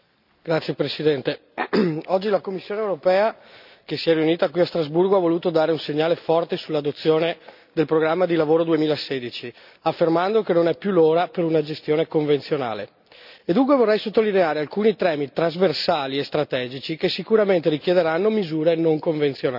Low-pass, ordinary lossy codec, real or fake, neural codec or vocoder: 5.4 kHz; none; real; none